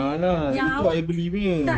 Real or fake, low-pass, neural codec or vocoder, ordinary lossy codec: fake; none; codec, 16 kHz, 4 kbps, X-Codec, HuBERT features, trained on general audio; none